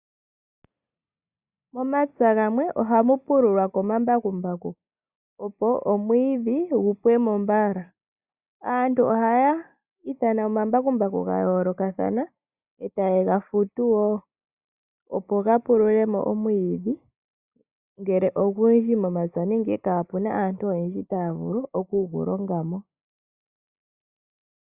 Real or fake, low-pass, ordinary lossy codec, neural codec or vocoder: real; 3.6 kHz; Opus, 64 kbps; none